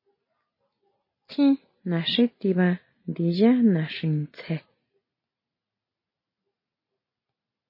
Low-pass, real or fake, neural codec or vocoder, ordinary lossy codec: 5.4 kHz; real; none; MP3, 24 kbps